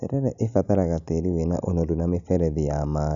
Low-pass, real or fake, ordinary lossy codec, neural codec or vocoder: 7.2 kHz; real; none; none